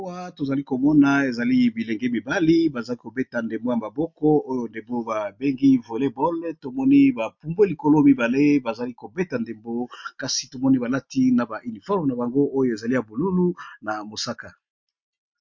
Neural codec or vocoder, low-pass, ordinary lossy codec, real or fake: none; 7.2 kHz; MP3, 48 kbps; real